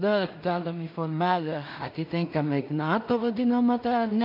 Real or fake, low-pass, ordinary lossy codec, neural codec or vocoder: fake; 5.4 kHz; MP3, 48 kbps; codec, 16 kHz in and 24 kHz out, 0.4 kbps, LongCat-Audio-Codec, two codebook decoder